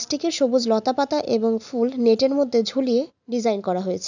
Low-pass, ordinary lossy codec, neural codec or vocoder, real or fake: 7.2 kHz; none; none; real